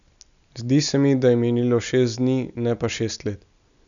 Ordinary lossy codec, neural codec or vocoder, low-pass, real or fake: none; none; 7.2 kHz; real